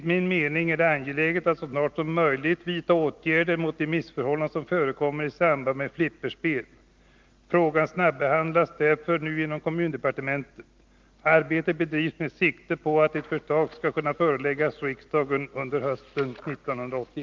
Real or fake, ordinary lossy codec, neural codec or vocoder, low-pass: real; Opus, 32 kbps; none; 7.2 kHz